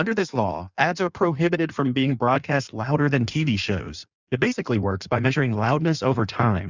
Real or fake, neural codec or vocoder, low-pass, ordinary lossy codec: fake; codec, 16 kHz in and 24 kHz out, 1.1 kbps, FireRedTTS-2 codec; 7.2 kHz; Opus, 64 kbps